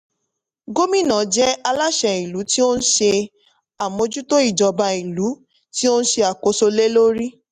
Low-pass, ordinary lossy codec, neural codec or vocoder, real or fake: 14.4 kHz; none; none; real